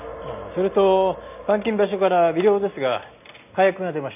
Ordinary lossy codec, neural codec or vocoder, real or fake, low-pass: none; none; real; 3.6 kHz